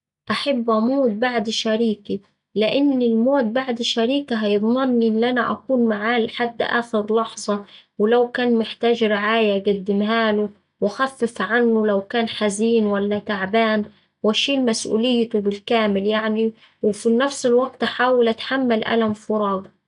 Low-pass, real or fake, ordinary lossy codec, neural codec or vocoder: 10.8 kHz; real; none; none